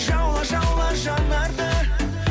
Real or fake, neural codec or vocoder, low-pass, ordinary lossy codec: real; none; none; none